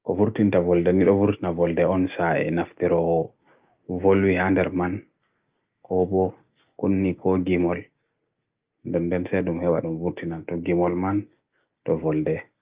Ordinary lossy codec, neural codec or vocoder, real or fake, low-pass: Opus, 24 kbps; none; real; 3.6 kHz